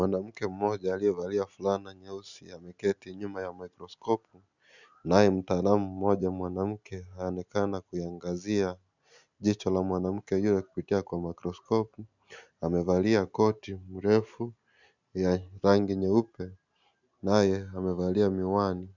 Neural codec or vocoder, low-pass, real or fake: none; 7.2 kHz; real